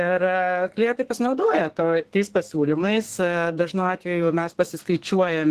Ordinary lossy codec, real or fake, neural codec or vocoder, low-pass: Opus, 16 kbps; fake; codec, 32 kHz, 1.9 kbps, SNAC; 14.4 kHz